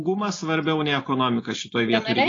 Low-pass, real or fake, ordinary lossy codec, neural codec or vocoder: 7.2 kHz; real; AAC, 32 kbps; none